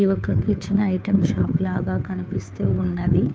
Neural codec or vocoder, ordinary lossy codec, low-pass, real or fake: codec, 16 kHz, 2 kbps, FunCodec, trained on Chinese and English, 25 frames a second; none; none; fake